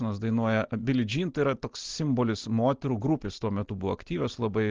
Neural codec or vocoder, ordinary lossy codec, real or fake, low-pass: none; Opus, 16 kbps; real; 7.2 kHz